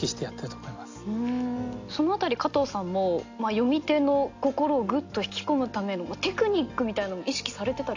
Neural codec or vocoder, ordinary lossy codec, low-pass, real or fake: none; AAC, 48 kbps; 7.2 kHz; real